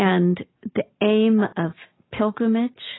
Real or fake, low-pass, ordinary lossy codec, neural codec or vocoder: real; 7.2 kHz; AAC, 16 kbps; none